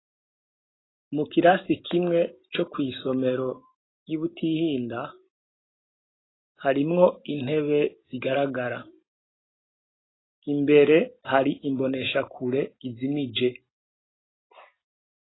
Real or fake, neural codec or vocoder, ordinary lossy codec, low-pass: real; none; AAC, 16 kbps; 7.2 kHz